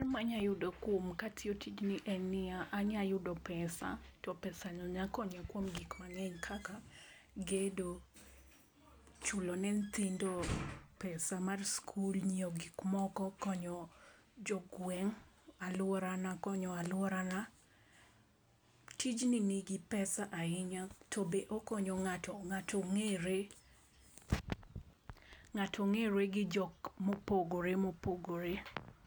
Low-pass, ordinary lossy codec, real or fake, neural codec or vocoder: none; none; real; none